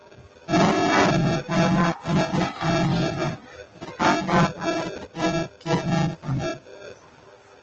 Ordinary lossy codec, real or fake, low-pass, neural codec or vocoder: Opus, 24 kbps; real; 7.2 kHz; none